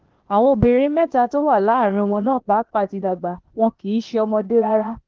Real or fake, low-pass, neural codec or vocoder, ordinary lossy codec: fake; 7.2 kHz; codec, 16 kHz, 0.8 kbps, ZipCodec; Opus, 16 kbps